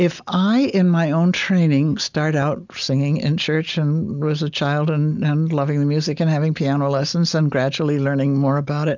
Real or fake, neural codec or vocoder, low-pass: real; none; 7.2 kHz